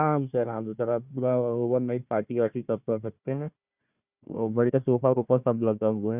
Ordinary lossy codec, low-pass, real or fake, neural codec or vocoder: Opus, 64 kbps; 3.6 kHz; fake; codec, 16 kHz, 1 kbps, FunCodec, trained on Chinese and English, 50 frames a second